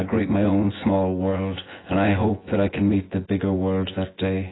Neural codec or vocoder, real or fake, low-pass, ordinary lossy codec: vocoder, 24 kHz, 100 mel bands, Vocos; fake; 7.2 kHz; AAC, 16 kbps